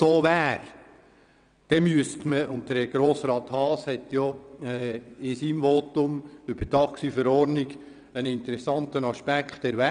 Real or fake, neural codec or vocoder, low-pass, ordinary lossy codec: fake; vocoder, 22.05 kHz, 80 mel bands, WaveNeXt; 9.9 kHz; none